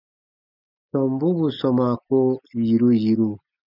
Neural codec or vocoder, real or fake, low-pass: none; real; 5.4 kHz